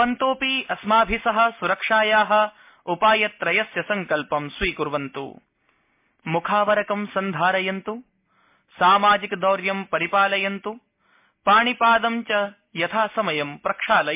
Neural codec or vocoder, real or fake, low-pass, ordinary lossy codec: none; real; 3.6 kHz; MP3, 32 kbps